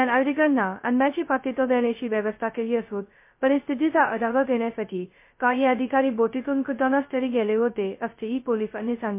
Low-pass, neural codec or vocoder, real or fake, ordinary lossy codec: 3.6 kHz; codec, 16 kHz, 0.2 kbps, FocalCodec; fake; MP3, 24 kbps